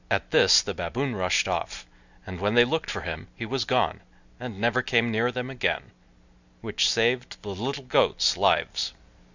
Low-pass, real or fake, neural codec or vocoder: 7.2 kHz; real; none